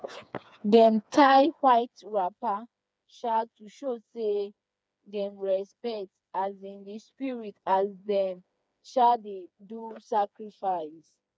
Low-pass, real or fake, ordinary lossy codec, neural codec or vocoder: none; fake; none; codec, 16 kHz, 4 kbps, FreqCodec, smaller model